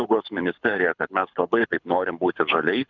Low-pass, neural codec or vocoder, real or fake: 7.2 kHz; codec, 24 kHz, 6 kbps, HILCodec; fake